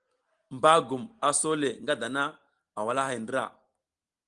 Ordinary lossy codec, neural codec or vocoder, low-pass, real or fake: Opus, 24 kbps; none; 10.8 kHz; real